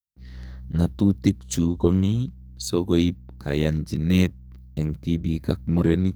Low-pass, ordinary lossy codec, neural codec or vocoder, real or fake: none; none; codec, 44.1 kHz, 2.6 kbps, SNAC; fake